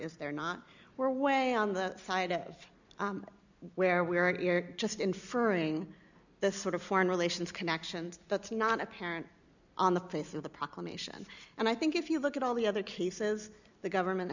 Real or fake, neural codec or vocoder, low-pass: real; none; 7.2 kHz